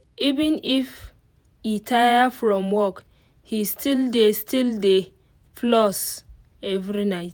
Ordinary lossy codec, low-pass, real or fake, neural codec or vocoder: none; none; fake; vocoder, 48 kHz, 128 mel bands, Vocos